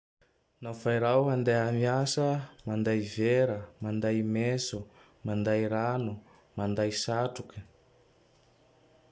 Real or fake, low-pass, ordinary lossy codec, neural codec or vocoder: real; none; none; none